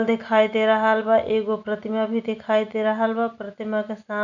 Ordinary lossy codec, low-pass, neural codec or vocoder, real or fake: none; 7.2 kHz; none; real